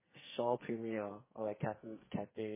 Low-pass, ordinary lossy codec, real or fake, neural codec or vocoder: 3.6 kHz; MP3, 16 kbps; fake; codec, 44.1 kHz, 2.6 kbps, SNAC